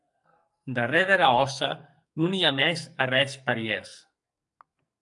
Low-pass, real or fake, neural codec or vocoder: 10.8 kHz; fake; codec, 44.1 kHz, 2.6 kbps, SNAC